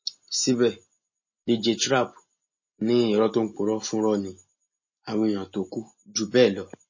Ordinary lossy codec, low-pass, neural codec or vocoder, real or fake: MP3, 32 kbps; 7.2 kHz; none; real